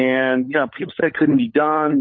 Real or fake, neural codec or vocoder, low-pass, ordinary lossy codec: fake; codec, 16 kHz, 16 kbps, FunCodec, trained on LibriTTS, 50 frames a second; 7.2 kHz; MP3, 32 kbps